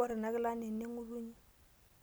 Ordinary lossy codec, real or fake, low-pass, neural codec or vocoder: none; real; none; none